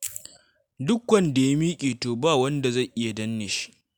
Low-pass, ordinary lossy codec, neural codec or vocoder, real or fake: none; none; none; real